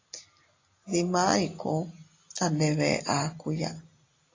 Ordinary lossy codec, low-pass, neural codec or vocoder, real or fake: AAC, 32 kbps; 7.2 kHz; none; real